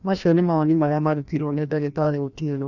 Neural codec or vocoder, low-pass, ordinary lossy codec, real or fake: codec, 16 kHz, 1 kbps, FreqCodec, larger model; 7.2 kHz; none; fake